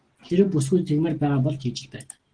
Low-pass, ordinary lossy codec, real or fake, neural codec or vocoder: 9.9 kHz; Opus, 16 kbps; real; none